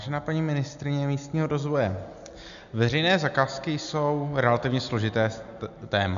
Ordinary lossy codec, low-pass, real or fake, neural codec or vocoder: AAC, 96 kbps; 7.2 kHz; real; none